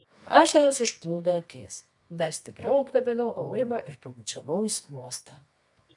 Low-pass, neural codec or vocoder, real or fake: 10.8 kHz; codec, 24 kHz, 0.9 kbps, WavTokenizer, medium music audio release; fake